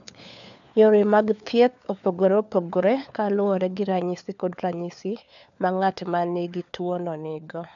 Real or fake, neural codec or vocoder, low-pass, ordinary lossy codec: fake; codec, 16 kHz, 4 kbps, FunCodec, trained on LibriTTS, 50 frames a second; 7.2 kHz; none